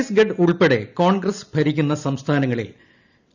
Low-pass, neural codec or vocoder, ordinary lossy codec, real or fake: 7.2 kHz; none; none; real